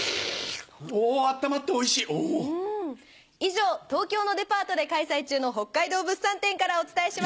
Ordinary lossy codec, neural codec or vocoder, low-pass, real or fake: none; none; none; real